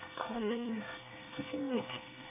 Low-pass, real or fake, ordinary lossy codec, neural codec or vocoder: 3.6 kHz; fake; none; codec, 24 kHz, 1 kbps, SNAC